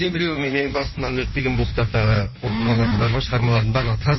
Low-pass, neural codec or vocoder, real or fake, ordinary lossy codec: 7.2 kHz; codec, 16 kHz in and 24 kHz out, 1.1 kbps, FireRedTTS-2 codec; fake; MP3, 24 kbps